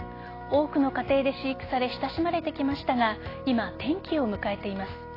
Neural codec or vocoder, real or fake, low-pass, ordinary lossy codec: none; real; 5.4 kHz; AAC, 24 kbps